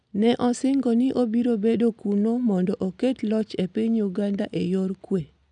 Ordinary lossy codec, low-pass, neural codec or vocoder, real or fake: none; 9.9 kHz; none; real